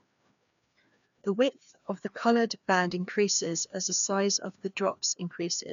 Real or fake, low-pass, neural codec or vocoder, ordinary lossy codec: fake; 7.2 kHz; codec, 16 kHz, 2 kbps, FreqCodec, larger model; none